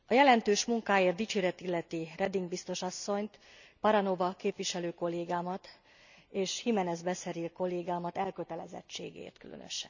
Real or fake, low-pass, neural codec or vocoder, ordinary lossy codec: real; 7.2 kHz; none; none